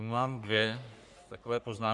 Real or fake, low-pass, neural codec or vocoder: fake; 10.8 kHz; codec, 44.1 kHz, 3.4 kbps, Pupu-Codec